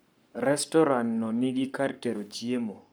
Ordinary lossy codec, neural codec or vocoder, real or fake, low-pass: none; codec, 44.1 kHz, 7.8 kbps, Pupu-Codec; fake; none